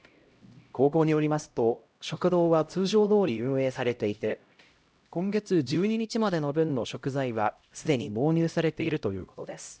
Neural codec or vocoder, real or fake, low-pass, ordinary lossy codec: codec, 16 kHz, 0.5 kbps, X-Codec, HuBERT features, trained on LibriSpeech; fake; none; none